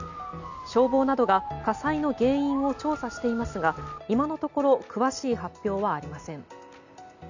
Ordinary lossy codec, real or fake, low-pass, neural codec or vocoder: none; real; 7.2 kHz; none